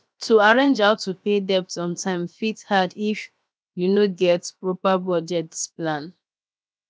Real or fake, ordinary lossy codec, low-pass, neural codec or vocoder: fake; none; none; codec, 16 kHz, 0.7 kbps, FocalCodec